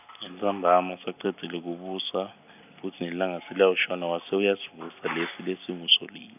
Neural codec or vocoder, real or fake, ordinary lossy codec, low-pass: none; real; none; 3.6 kHz